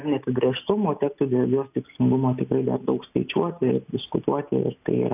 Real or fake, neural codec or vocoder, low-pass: real; none; 3.6 kHz